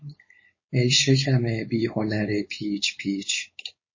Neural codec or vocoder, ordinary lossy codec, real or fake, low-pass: codec, 16 kHz, 4.8 kbps, FACodec; MP3, 32 kbps; fake; 7.2 kHz